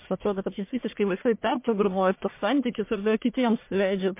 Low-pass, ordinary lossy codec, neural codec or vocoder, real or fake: 3.6 kHz; MP3, 24 kbps; codec, 44.1 kHz, 1.7 kbps, Pupu-Codec; fake